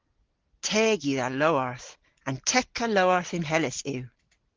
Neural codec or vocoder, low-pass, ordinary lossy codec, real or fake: none; 7.2 kHz; Opus, 16 kbps; real